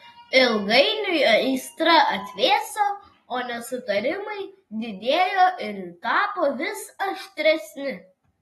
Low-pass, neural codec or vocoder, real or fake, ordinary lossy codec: 19.8 kHz; none; real; AAC, 32 kbps